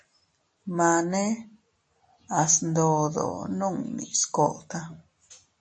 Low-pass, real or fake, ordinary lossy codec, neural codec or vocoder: 9.9 kHz; real; MP3, 32 kbps; none